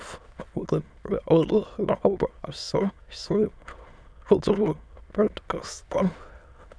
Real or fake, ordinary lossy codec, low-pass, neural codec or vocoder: fake; none; none; autoencoder, 22.05 kHz, a latent of 192 numbers a frame, VITS, trained on many speakers